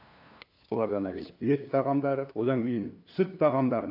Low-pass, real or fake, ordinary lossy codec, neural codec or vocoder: 5.4 kHz; fake; AAC, 32 kbps; codec, 16 kHz, 2 kbps, FunCodec, trained on LibriTTS, 25 frames a second